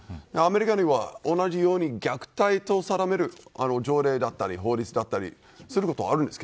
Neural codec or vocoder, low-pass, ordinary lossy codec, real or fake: none; none; none; real